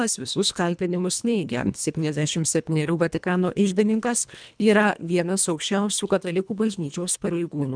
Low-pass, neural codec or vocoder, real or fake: 9.9 kHz; codec, 24 kHz, 1.5 kbps, HILCodec; fake